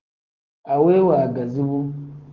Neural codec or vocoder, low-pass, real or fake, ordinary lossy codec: codec, 44.1 kHz, 7.8 kbps, Pupu-Codec; 7.2 kHz; fake; Opus, 16 kbps